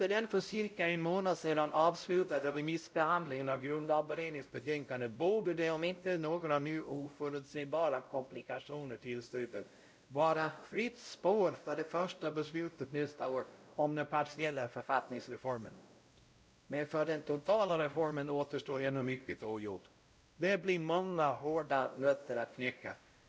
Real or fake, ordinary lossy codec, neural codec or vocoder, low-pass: fake; none; codec, 16 kHz, 0.5 kbps, X-Codec, WavLM features, trained on Multilingual LibriSpeech; none